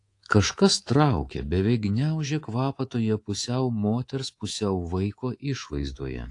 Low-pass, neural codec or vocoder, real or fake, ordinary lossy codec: 10.8 kHz; codec, 24 kHz, 3.1 kbps, DualCodec; fake; AAC, 48 kbps